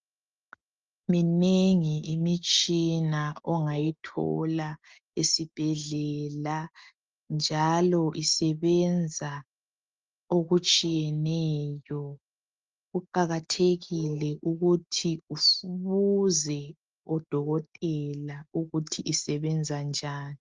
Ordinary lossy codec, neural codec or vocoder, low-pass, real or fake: Opus, 32 kbps; none; 7.2 kHz; real